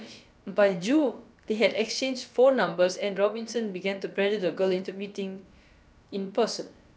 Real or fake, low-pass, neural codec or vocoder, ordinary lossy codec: fake; none; codec, 16 kHz, about 1 kbps, DyCAST, with the encoder's durations; none